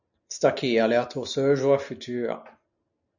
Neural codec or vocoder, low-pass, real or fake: none; 7.2 kHz; real